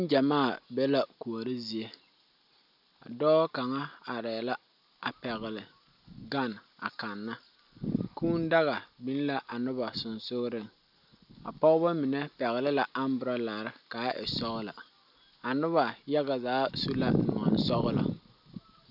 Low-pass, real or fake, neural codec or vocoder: 5.4 kHz; real; none